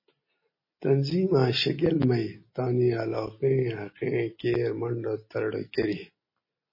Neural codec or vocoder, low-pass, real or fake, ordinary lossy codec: none; 5.4 kHz; real; MP3, 24 kbps